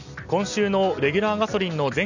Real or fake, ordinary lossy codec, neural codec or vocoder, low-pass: real; none; none; 7.2 kHz